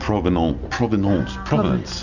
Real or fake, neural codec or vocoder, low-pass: real; none; 7.2 kHz